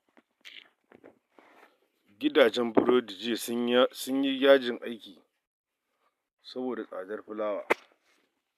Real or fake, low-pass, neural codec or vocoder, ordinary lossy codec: real; 14.4 kHz; none; AAC, 96 kbps